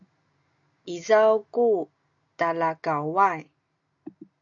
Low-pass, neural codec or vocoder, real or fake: 7.2 kHz; none; real